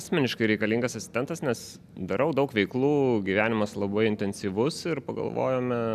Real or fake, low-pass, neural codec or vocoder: real; 14.4 kHz; none